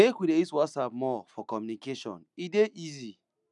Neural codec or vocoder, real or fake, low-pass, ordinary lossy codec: none; real; 10.8 kHz; none